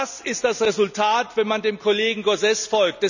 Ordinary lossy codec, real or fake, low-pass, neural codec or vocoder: none; real; 7.2 kHz; none